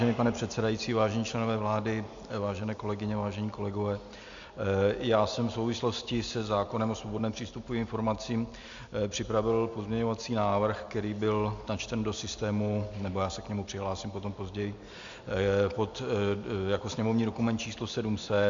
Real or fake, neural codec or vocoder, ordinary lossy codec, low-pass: real; none; MP3, 64 kbps; 7.2 kHz